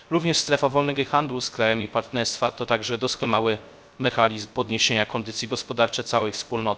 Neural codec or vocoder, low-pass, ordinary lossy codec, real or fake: codec, 16 kHz, 0.3 kbps, FocalCodec; none; none; fake